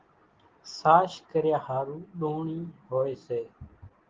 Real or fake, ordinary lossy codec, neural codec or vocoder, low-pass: real; Opus, 16 kbps; none; 7.2 kHz